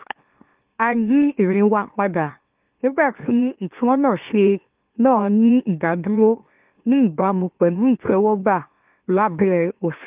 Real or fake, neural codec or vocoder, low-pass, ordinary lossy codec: fake; autoencoder, 44.1 kHz, a latent of 192 numbers a frame, MeloTTS; 3.6 kHz; Opus, 24 kbps